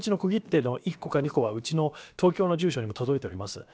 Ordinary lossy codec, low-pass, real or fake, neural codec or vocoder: none; none; fake; codec, 16 kHz, about 1 kbps, DyCAST, with the encoder's durations